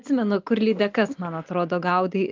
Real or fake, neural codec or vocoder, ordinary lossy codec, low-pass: real; none; Opus, 24 kbps; 7.2 kHz